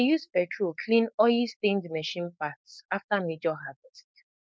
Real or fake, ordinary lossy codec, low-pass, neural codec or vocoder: fake; none; none; codec, 16 kHz, 4.8 kbps, FACodec